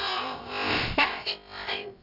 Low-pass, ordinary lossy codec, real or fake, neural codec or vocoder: 5.4 kHz; none; fake; codec, 16 kHz, about 1 kbps, DyCAST, with the encoder's durations